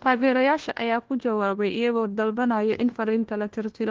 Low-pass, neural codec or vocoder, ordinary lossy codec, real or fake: 7.2 kHz; codec, 16 kHz, 1 kbps, FunCodec, trained on LibriTTS, 50 frames a second; Opus, 16 kbps; fake